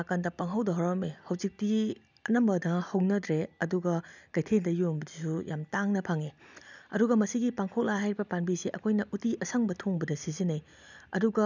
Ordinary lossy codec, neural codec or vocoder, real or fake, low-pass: none; none; real; 7.2 kHz